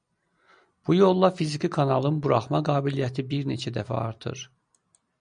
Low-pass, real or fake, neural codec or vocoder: 9.9 kHz; real; none